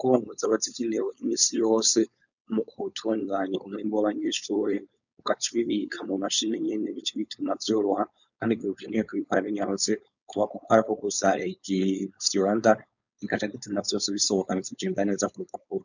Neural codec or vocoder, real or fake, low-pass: codec, 16 kHz, 4.8 kbps, FACodec; fake; 7.2 kHz